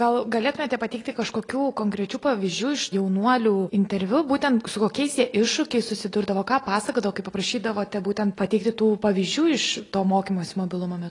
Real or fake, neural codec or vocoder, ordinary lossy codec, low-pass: real; none; AAC, 32 kbps; 10.8 kHz